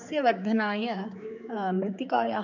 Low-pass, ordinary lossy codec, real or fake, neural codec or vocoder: 7.2 kHz; none; fake; codec, 16 kHz, 4 kbps, X-Codec, HuBERT features, trained on general audio